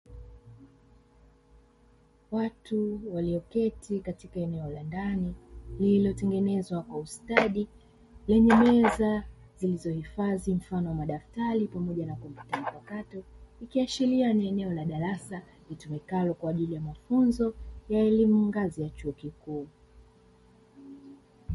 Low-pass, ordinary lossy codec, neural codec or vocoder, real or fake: 19.8 kHz; MP3, 48 kbps; none; real